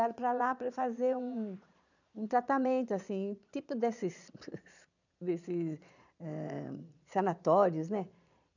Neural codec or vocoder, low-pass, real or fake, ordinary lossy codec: vocoder, 22.05 kHz, 80 mel bands, Vocos; 7.2 kHz; fake; none